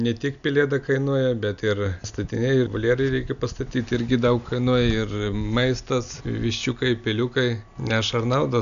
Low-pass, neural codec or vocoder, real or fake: 7.2 kHz; none; real